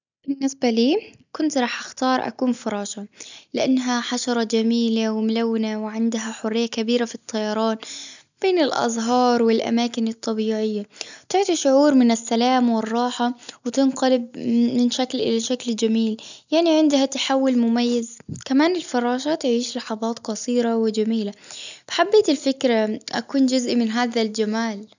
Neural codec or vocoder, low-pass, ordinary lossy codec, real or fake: none; 7.2 kHz; none; real